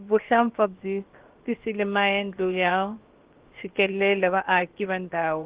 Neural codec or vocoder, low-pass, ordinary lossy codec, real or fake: codec, 16 kHz, about 1 kbps, DyCAST, with the encoder's durations; 3.6 kHz; Opus, 16 kbps; fake